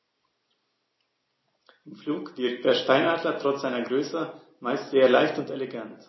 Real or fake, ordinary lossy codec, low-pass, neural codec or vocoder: real; MP3, 24 kbps; 7.2 kHz; none